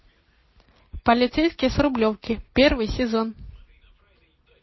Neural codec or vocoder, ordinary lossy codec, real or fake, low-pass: none; MP3, 24 kbps; real; 7.2 kHz